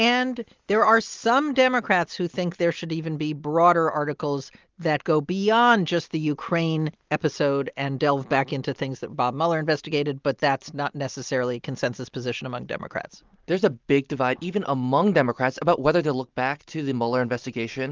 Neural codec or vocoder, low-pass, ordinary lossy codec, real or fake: none; 7.2 kHz; Opus, 32 kbps; real